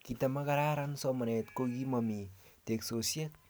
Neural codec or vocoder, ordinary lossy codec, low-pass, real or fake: none; none; none; real